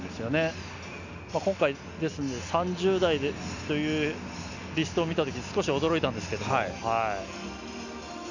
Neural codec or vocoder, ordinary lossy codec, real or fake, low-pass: none; none; real; 7.2 kHz